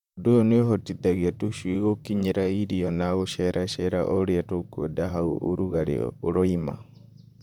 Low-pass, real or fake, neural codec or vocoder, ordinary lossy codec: 19.8 kHz; fake; vocoder, 44.1 kHz, 128 mel bands, Pupu-Vocoder; none